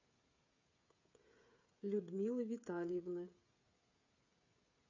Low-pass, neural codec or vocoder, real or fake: 7.2 kHz; codec, 16 kHz, 16 kbps, FreqCodec, smaller model; fake